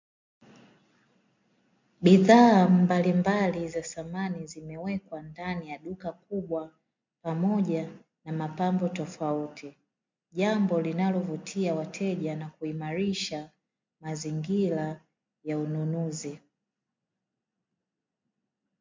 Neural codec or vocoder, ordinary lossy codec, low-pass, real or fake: none; MP3, 64 kbps; 7.2 kHz; real